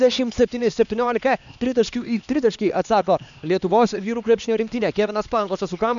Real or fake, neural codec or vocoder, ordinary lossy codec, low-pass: fake; codec, 16 kHz, 2 kbps, X-Codec, HuBERT features, trained on LibriSpeech; MP3, 96 kbps; 7.2 kHz